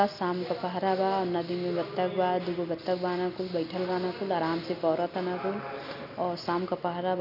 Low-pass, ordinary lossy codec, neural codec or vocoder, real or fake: 5.4 kHz; none; none; real